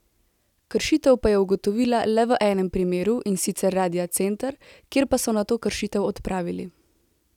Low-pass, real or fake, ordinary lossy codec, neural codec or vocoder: 19.8 kHz; real; none; none